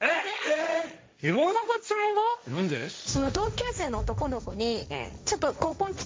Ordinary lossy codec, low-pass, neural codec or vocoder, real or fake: none; none; codec, 16 kHz, 1.1 kbps, Voila-Tokenizer; fake